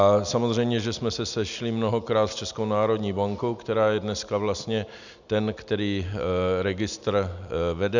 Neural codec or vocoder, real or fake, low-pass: none; real; 7.2 kHz